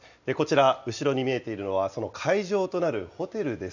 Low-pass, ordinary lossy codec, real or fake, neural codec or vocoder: 7.2 kHz; none; real; none